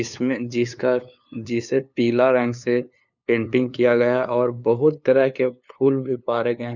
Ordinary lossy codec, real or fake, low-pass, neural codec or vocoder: none; fake; 7.2 kHz; codec, 16 kHz, 2 kbps, FunCodec, trained on LibriTTS, 25 frames a second